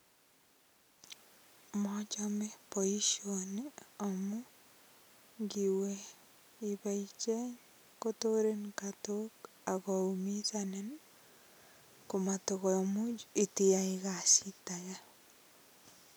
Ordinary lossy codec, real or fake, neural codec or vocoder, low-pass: none; real; none; none